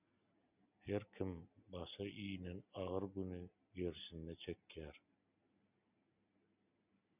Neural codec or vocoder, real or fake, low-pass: none; real; 3.6 kHz